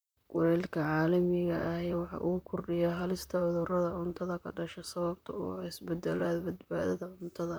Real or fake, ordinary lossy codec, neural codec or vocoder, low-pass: fake; none; vocoder, 44.1 kHz, 128 mel bands, Pupu-Vocoder; none